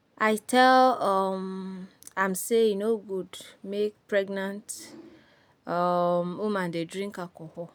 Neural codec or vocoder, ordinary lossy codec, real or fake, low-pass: none; none; real; none